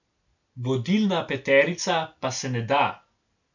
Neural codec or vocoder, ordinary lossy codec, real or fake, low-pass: none; none; real; 7.2 kHz